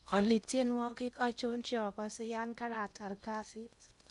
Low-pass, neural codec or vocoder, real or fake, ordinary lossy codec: 10.8 kHz; codec, 16 kHz in and 24 kHz out, 0.8 kbps, FocalCodec, streaming, 65536 codes; fake; none